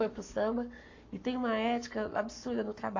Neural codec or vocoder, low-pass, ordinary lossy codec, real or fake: codec, 44.1 kHz, 7.8 kbps, Pupu-Codec; 7.2 kHz; AAC, 48 kbps; fake